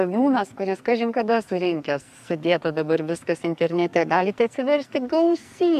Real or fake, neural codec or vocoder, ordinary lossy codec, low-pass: fake; codec, 44.1 kHz, 2.6 kbps, SNAC; AAC, 96 kbps; 14.4 kHz